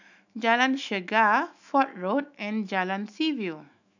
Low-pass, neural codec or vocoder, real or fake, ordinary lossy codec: 7.2 kHz; autoencoder, 48 kHz, 128 numbers a frame, DAC-VAE, trained on Japanese speech; fake; none